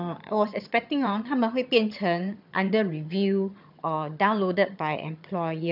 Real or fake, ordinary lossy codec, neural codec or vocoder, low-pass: fake; none; vocoder, 22.05 kHz, 80 mel bands, HiFi-GAN; 5.4 kHz